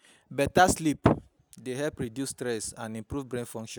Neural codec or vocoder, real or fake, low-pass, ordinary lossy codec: none; real; none; none